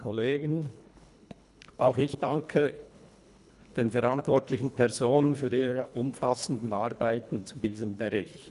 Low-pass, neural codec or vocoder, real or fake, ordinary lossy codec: 10.8 kHz; codec, 24 kHz, 1.5 kbps, HILCodec; fake; none